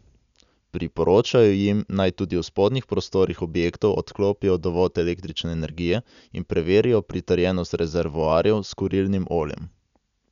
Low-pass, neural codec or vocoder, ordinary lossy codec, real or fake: 7.2 kHz; none; none; real